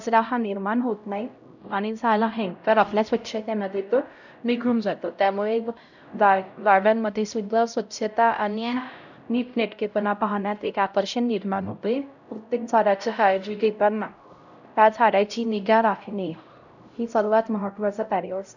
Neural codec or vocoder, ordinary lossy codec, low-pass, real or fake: codec, 16 kHz, 0.5 kbps, X-Codec, HuBERT features, trained on LibriSpeech; none; 7.2 kHz; fake